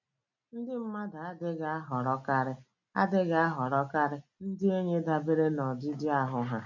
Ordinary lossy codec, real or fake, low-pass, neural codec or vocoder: none; real; 7.2 kHz; none